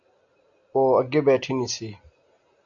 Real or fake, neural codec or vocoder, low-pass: real; none; 7.2 kHz